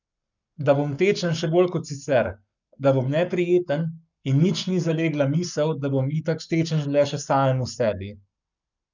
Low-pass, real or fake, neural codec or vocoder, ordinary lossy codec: 7.2 kHz; fake; codec, 44.1 kHz, 7.8 kbps, Pupu-Codec; none